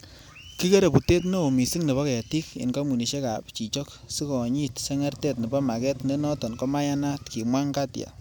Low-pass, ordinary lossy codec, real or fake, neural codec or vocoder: none; none; real; none